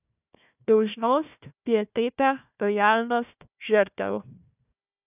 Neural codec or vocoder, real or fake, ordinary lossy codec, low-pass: codec, 16 kHz, 1 kbps, FunCodec, trained on Chinese and English, 50 frames a second; fake; none; 3.6 kHz